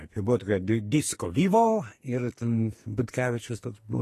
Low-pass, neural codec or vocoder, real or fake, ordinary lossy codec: 14.4 kHz; codec, 44.1 kHz, 2.6 kbps, SNAC; fake; AAC, 48 kbps